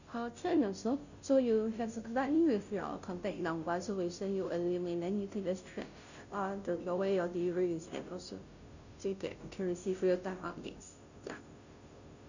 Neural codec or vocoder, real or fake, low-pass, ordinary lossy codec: codec, 16 kHz, 0.5 kbps, FunCodec, trained on Chinese and English, 25 frames a second; fake; 7.2 kHz; none